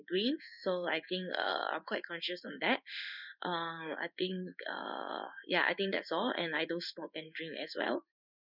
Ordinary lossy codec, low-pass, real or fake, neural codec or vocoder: none; 5.4 kHz; fake; codec, 16 kHz in and 24 kHz out, 1 kbps, XY-Tokenizer